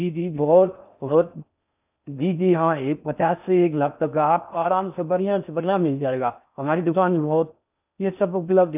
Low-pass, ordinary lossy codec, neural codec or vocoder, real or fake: 3.6 kHz; none; codec, 16 kHz in and 24 kHz out, 0.6 kbps, FocalCodec, streaming, 4096 codes; fake